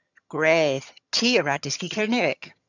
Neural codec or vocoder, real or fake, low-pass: vocoder, 22.05 kHz, 80 mel bands, HiFi-GAN; fake; 7.2 kHz